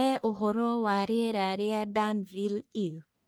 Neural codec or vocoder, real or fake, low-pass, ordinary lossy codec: codec, 44.1 kHz, 1.7 kbps, Pupu-Codec; fake; none; none